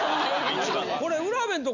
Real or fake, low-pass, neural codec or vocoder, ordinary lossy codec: real; 7.2 kHz; none; none